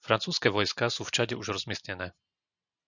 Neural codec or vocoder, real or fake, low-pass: none; real; 7.2 kHz